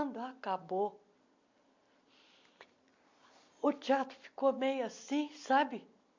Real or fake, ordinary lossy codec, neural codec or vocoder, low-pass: real; MP3, 48 kbps; none; 7.2 kHz